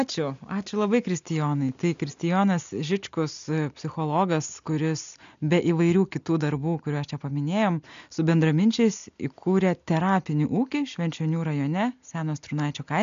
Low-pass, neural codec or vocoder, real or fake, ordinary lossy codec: 7.2 kHz; none; real; MP3, 64 kbps